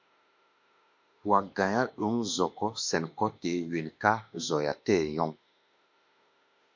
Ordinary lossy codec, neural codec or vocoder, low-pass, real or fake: MP3, 48 kbps; autoencoder, 48 kHz, 32 numbers a frame, DAC-VAE, trained on Japanese speech; 7.2 kHz; fake